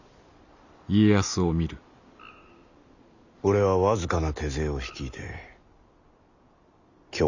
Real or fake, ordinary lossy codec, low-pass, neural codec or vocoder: real; none; 7.2 kHz; none